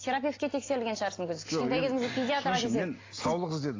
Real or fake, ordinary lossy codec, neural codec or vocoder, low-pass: real; AAC, 32 kbps; none; 7.2 kHz